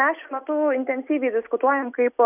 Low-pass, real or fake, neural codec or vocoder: 3.6 kHz; real; none